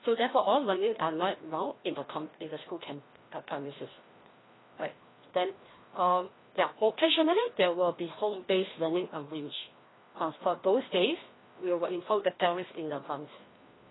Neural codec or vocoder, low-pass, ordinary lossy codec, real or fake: codec, 16 kHz, 1 kbps, FreqCodec, larger model; 7.2 kHz; AAC, 16 kbps; fake